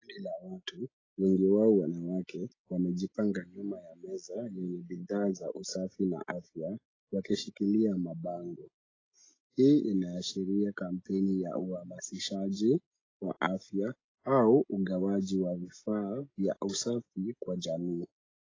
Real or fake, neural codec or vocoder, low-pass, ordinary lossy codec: real; none; 7.2 kHz; AAC, 32 kbps